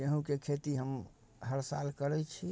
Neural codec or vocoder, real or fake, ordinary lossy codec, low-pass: none; real; none; none